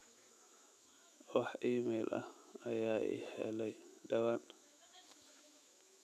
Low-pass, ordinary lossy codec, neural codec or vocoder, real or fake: 14.4 kHz; none; autoencoder, 48 kHz, 128 numbers a frame, DAC-VAE, trained on Japanese speech; fake